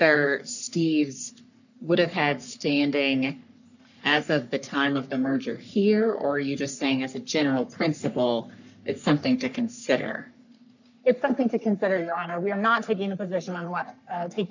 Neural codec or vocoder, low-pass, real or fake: codec, 44.1 kHz, 3.4 kbps, Pupu-Codec; 7.2 kHz; fake